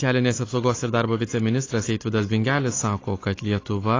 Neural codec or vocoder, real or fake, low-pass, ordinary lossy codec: none; real; 7.2 kHz; AAC, 32 kbps